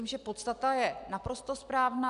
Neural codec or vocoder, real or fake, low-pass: none; real; 10.8 kHz